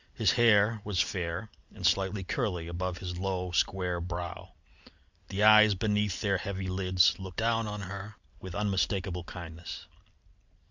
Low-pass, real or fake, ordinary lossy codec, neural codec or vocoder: 7.2 kHz; real; Opus, 64 kbps; none